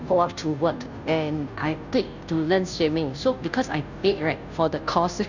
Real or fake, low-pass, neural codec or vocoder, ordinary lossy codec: fake; 7.2 kHz; codec, 16 kHz, 0.5 kbps, FunCodec, trained on Chinese and English, 25 frames a second; none